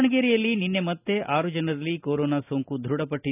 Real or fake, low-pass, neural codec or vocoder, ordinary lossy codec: real; 3.6 kHz; none; none